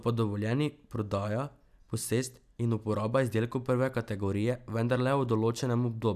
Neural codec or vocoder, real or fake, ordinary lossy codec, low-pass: none; real; none; 14.4 kHz